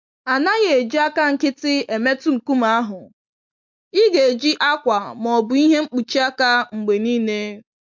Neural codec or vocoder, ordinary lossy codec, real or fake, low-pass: none; MP3, 64 kbps; real; 7.2 kHz